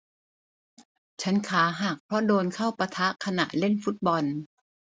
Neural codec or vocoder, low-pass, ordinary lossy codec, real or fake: none; none; none; real